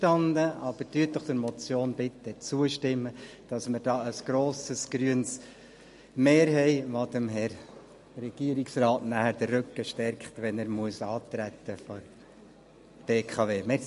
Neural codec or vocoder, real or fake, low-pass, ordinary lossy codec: none; real; 14.4 kHz; MP3, 48 kbps